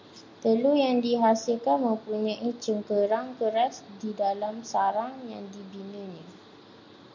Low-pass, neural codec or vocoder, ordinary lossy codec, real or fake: 7.2 kHz; none; MP3, 64 kbps; real